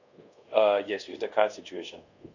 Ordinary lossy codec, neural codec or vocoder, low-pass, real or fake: none; codec, 24 kHz, 0.5 kbps, DualCodec; 7.2 kHz; fake